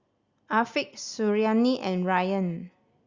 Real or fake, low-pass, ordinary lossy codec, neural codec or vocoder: real; 7.2 kHz; Opus, 64 kbps; none